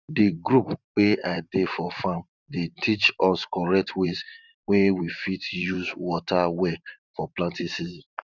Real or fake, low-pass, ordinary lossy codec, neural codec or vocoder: fake; 7.2 kHz; none; vocoder, 44.1 kHz, 128 mel bands every 512 samples, BigVGAN v2